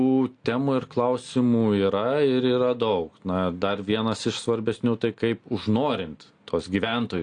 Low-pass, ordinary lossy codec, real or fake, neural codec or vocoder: 9.9 kHz; AAC, 48 kbps; real; none